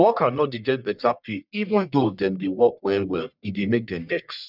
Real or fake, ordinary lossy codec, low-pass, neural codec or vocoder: fake; none; 5.4 kHz; codec, 44.1 kHz, 1.7 kbps, Pupu-Codec